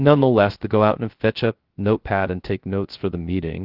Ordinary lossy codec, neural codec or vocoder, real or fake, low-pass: Opus, 16 kbps; codec, 16 kHz, 0.3 kbps, FocalCodec; fake; 5.4 kHz